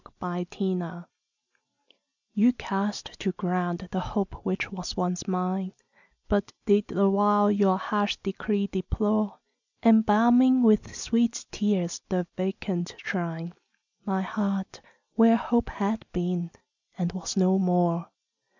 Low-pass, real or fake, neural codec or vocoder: 7.2 kHz; real; none